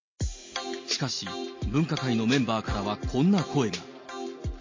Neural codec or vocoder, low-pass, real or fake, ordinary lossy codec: none; 7.2 kHz; real; MP3, 32 kbps